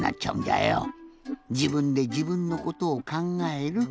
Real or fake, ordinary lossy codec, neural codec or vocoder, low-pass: real; none; none; none